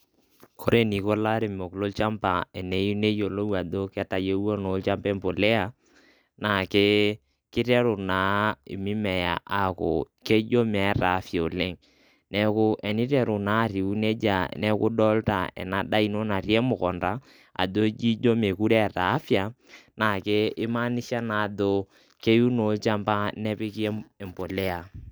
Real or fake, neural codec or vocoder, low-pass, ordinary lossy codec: real; none; none; none